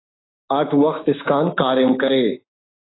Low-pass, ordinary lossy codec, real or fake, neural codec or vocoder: 7.2 kHz; AAC, 16 kbps; fake; codec, 16 kHz, 6 kbps, DAC